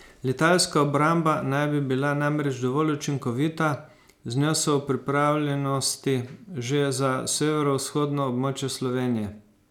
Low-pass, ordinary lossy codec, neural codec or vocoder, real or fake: 19.8 kHz; none; none; real